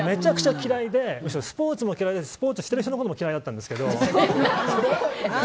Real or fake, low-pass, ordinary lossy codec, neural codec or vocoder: real; none; none; none